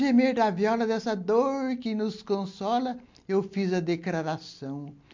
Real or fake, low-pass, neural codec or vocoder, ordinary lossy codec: real; 7.2 kHz; none; MP3, 48 kbps